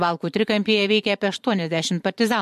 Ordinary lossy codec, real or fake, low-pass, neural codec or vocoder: MP3, 64 kbps; fake; 14.4 kHz; vocoder, 44.1 kHz, 128 mel bands every 512 samples, BigVGAN v2